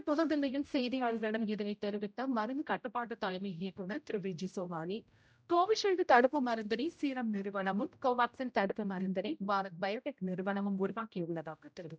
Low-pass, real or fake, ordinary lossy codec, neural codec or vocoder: none; fake; none; codec, 16 kHz, 0.5 kbps, X-Codec, HuBERT features, trained on general audio